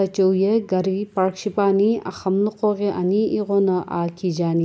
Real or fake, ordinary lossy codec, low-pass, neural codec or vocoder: real; none; none; none